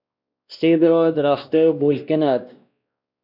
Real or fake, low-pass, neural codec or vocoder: fake; 5.4 kHz; codec, 16 kHz, 1 kbps, X-Codec, WavLM features, trained on Multilingual LibriSpeech